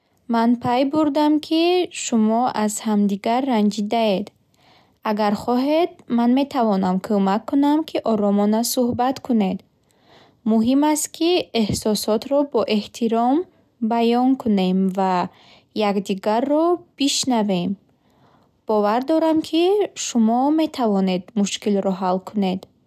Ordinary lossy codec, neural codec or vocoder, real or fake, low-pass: none; none; real; 14.4 kHz